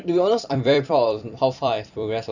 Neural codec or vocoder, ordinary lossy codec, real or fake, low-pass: vocoder, 44.1 kHz, 128 mel bands every 256 samples, BigVGAN v2; none; fake; 7.2 kHz